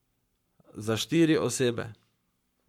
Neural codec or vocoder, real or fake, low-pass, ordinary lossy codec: codec, 44.1 kHz, 7.8 kbps, Pupu-Codec; fake; 19.8 kHz; MP3, 96 kbps